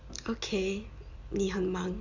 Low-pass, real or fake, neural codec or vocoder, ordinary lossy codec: 7.2 kHz; real; none; none